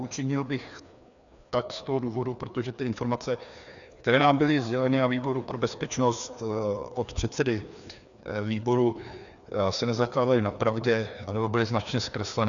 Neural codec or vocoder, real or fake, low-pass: codec, 16 kHz, 2 kbps, FreqCodec, larger model; fake; 7.2 kHz